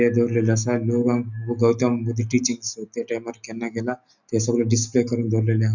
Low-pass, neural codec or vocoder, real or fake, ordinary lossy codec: 7.2 kHz; none; real; none